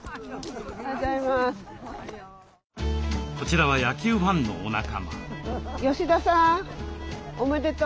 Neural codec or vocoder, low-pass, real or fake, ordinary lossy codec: none; none; real; none